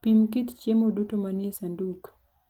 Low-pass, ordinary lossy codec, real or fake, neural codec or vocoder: 19.8 kHz; Opus, 24 kbps; real; none